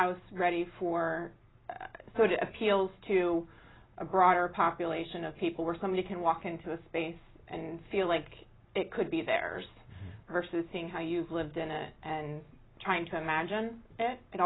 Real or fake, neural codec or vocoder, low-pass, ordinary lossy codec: real; none; 7.2 kHz; AAC, 16 kbps